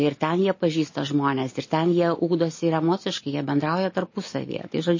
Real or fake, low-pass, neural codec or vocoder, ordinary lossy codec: real; 7.2 kHz; none; MP3, 32 kbps